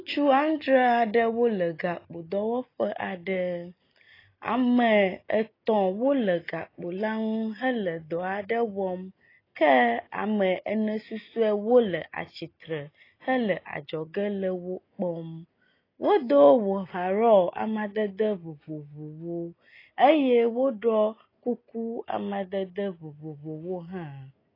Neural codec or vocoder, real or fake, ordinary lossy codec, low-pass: none; real; AAC, 24 kbps; 5.4 kHz